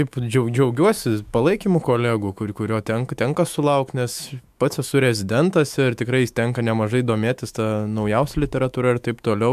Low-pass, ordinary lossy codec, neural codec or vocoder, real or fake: 14.4 kHz; MP3, 96 kbps; autoencoder, 48 kHz, 128 numbers a frame, DAC-VAE, trained on Japanese speech; fake